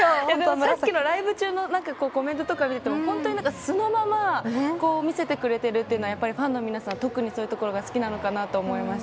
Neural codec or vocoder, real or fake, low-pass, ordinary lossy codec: none; real; none; none